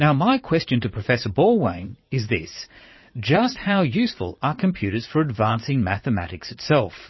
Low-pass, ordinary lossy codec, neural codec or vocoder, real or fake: 7.2 kHz; MP3, 24 kbps; vocoder, 44.1 kHz, 80 mel bands, Vocos; fake